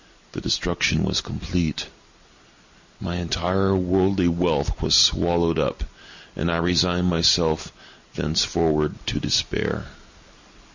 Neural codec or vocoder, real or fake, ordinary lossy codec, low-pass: none; real; Opus, 64 kbps; 7.2 kHz